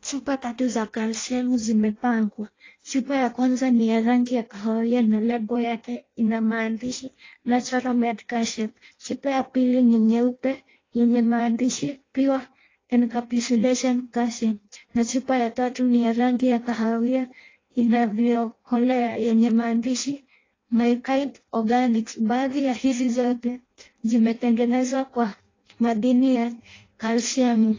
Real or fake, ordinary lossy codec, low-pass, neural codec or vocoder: fake; AAC, 32 kbps; 7.2 kHz; codec, 16 kHz in and 24 kHz out, 0.6 kbps, FireRedTTS-2 codec